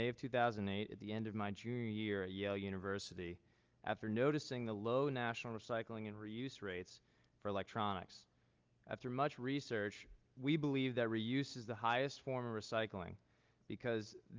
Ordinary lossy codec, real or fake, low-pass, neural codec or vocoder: Opus, 24 kbps; real; 7.2 kHz; none